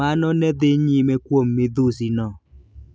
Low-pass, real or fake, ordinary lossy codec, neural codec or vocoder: none; real; none; none